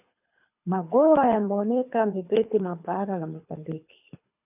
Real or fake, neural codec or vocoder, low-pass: fake; codec, 24 kHz, 3 kbps, HILCodec; 3.6 kHz